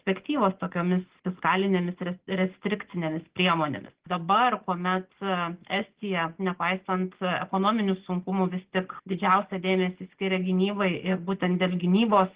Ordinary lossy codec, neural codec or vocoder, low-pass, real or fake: Opus, 16 kbps; none; 3.6 kHz; real